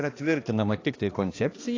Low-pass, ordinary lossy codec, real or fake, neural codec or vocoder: 7.2 kHz; AAC, 32 kbps; fake; codec, 16 kHz, 2 kbps, X-Codec, HuBERT features, trained on balanced general audio